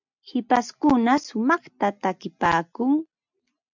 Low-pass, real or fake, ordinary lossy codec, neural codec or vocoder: 7.2 kHz; real; MP3, 64 kbps; none